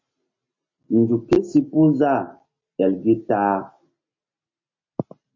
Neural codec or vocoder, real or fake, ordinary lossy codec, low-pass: none; real; MP3, 32 kbps; 7.2 kHz